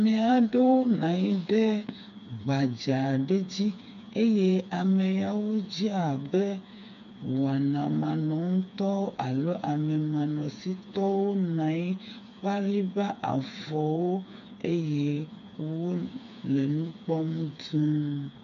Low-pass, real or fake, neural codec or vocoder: 7.2 kHz; fake; codec, 16 kHz, 4 kbps, FreqCodec, smaller model